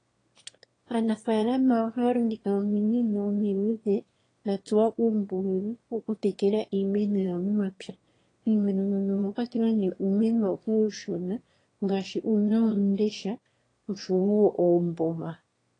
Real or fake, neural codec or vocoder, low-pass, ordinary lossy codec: fake; autoencoder, 22.05 kHz, a latent of 192 numbers a frame, VITS, trained on one speaker; 9.9 kHz; AAC, 32 kbps